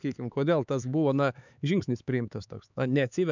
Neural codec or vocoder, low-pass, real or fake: codec, 16 kHz, 4 kbps, X-Codec, HuBERT features, trained on LibriSpeech; 7.2 kHz; fake